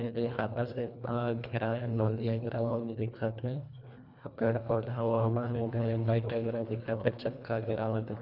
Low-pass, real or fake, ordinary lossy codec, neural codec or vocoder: 5.4 kHz; fake; none; codec, 24 kHz, 1.5 kbps, HILCodec